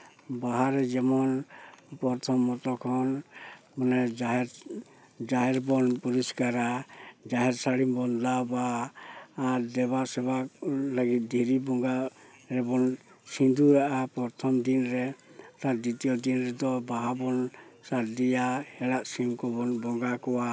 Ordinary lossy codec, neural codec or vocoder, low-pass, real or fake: none; none; none; real